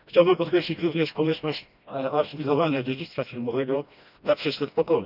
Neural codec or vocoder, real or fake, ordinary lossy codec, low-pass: codec, 16 kHz, 1 kbps, FreqCodec, smaller model; fake; none; 5.4 kHz